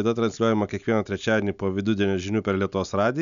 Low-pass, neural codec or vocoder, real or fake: 7.2 kHz; none; real